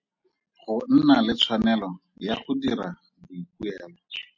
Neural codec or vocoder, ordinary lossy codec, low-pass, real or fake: none; MP3, 48 kbps; 7.2 kHz; real